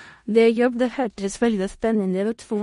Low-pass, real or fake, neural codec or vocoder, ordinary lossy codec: 10.8 kHz; fake; codec, 16 kHz in and 24 kHz out, 0.4 kbps, LongCat-Audio-Codec, four codebook decoder; MP3, 48 kbps